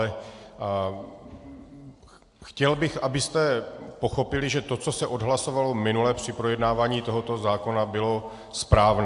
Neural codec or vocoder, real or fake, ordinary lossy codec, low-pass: none; real; AAC, 64 kbps; 10.8 kHz